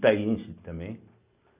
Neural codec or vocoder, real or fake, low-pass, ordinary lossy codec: none; real; 3.6 kHz; Opus, 64 kbps